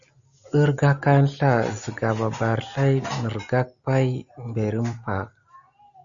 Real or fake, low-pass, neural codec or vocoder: real; 7.2 kHz; none